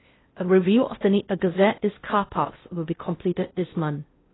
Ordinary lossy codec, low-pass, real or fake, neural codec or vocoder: AAC, 16 kbps; 7.2 kHz; fake; codec, 16 kHz in and 24 kHz out, 0.6 kbps, FocalCodec, streaming, 4096 codes